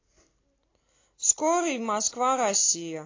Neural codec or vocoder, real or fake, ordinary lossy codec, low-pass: none; real; AAC, 32 kbps; 7.2 kHz